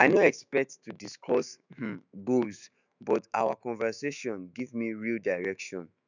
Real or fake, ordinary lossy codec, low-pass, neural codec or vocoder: fake; none; 7.2 kHz; autoencoder, 48 kHz, 128 numbers a frame, DAC-VAE, trained on Japanese speech